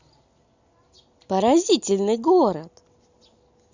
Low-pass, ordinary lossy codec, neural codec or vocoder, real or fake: 7.2 kHz; Opus, 64 kbps; none; real